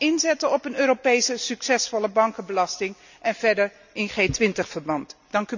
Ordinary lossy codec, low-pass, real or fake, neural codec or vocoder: none; 7.2 kHz; real; none